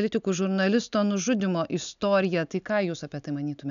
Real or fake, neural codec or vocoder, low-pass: real; none; 7.2 kHz